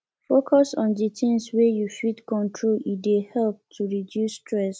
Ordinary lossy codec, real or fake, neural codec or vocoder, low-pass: none; real; none; none